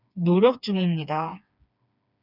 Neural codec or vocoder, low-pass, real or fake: codec, 16 kHz, 4 kbps, FreqCodec, smaller model; 5.4 kHz; fake